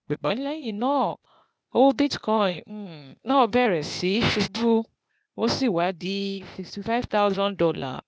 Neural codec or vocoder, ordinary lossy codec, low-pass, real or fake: codec, 16 kHz, 0.8 kbps, ZipCodec; none; none; fake